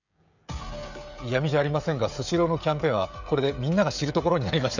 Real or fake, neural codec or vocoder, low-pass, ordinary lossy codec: fake; codec, 16 kHz, 16 kbps, FreqCodec, smaller model; 7.2 kHz; none